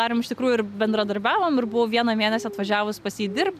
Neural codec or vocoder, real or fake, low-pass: none; real; 14.4 kHz